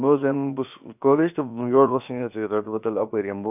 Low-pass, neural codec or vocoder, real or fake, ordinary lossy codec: 3.6 kHz; codec, 16 kHz, about 1 kbps, DyCAST, with the encoder's durations; fake; none